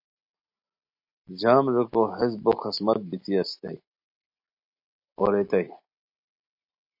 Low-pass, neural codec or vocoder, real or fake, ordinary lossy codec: 5.4 kHz; none; real; MP3, 32 kbps